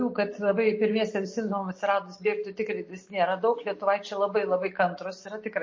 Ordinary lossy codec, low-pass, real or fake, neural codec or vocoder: MP3, 32 kbps; 7.2 kHz; real; none